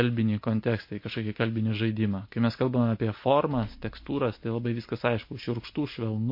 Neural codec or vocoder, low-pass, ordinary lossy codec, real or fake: none; 5.4 kHz; MP3, 32 kbps; real